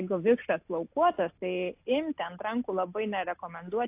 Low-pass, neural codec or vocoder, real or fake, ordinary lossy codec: 3.6 kHz; none; real; AAC, 32 kbps